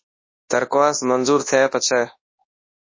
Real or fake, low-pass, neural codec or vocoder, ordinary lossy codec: fake; 7.2 kHz; codec, 24 kHz, 0.9 kbps, WavTokenizer, large speech release; MP3, 32 kbps